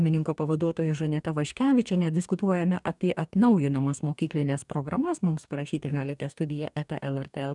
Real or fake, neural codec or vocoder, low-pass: fake; codec, 44.1 kHz, 2.6 kbps, DAC; 10.8 kHz